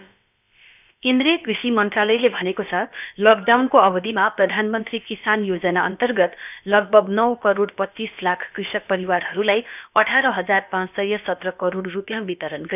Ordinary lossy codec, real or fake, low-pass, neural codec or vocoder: none; fake; 3.6 kHz; codec, 16 kHz, about 1 kbps, DyCAST, with the encoder's durations